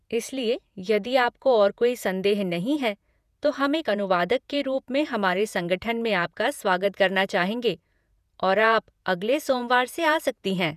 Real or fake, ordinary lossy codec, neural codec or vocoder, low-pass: fake; none; vocoder, 48 kHz, 128 mel bands, Vocos; 14.4 kHz